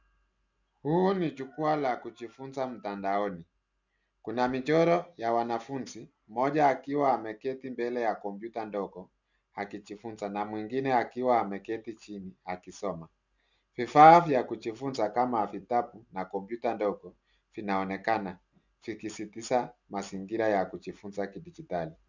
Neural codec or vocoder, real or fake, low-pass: none; real; 7.2 kHz